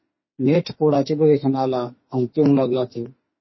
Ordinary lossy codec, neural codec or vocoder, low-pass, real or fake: MP3, 24 kbps; autoencoder, 48 kHz, 32 numbers a frame, DAC-VAE, trained on Japanese speech; 7.2 kHz; fake